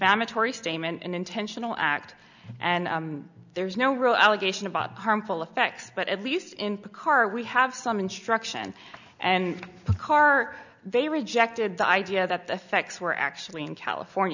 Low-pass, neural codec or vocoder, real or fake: 7.2 kHz; none; real